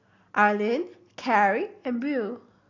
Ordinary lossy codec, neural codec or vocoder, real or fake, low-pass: AAC, 32 kbps; none; real; 7.2 kHz